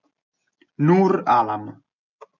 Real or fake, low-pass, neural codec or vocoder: real; 7.2 kHz; none